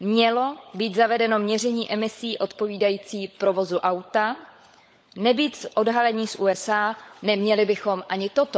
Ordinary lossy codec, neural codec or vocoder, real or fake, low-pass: none; codec, 16 kHz, 16 kbps, FunCodec, trained on LibriTTS, 50 frames a second; fake; none